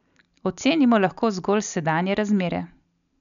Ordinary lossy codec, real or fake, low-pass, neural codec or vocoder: none; real; 7.2 kHz; none